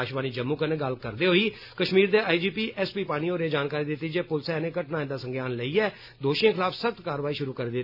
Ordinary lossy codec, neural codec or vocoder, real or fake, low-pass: none; none; real; 5.4 kHz